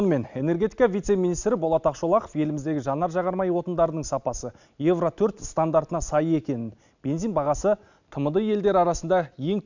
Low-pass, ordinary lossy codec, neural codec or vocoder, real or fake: 7.2 kHz; none; none; real